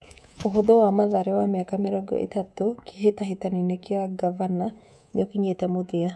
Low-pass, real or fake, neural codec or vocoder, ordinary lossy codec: none; fake; codec, 24 kHz, 3.1 kbps, DualCodec; none